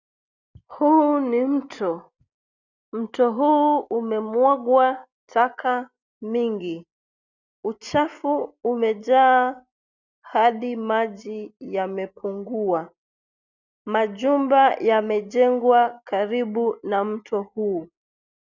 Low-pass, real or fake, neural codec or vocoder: 7.2 kHz; real; none